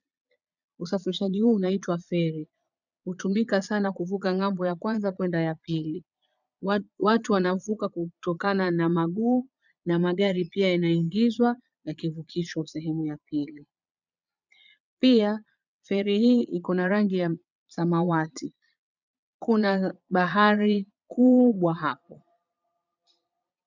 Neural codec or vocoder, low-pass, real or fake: vocoder, 22.05 kHz, 80 mel bands, Vocos; 7.2 kHz; fake